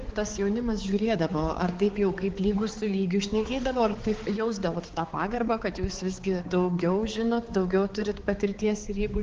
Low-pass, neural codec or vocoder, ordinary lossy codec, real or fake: 7.2 kHz; codec, 16 kHz, 4 kbps, X-Codec, HuBERT features, trained on balanced general audio; Opus, 16 kbps; fake